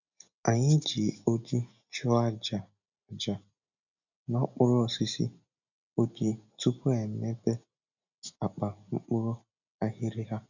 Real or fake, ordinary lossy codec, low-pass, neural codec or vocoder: real; none; 7.2 kHz; none